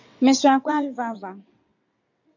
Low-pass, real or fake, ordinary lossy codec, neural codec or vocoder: 7.2 kHz; fake; AAC, 48 kbps; vocoder, 22.05 kHz, 80 mel bands, WaveNeXt